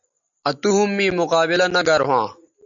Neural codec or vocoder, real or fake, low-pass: none; real; 7.2 kHz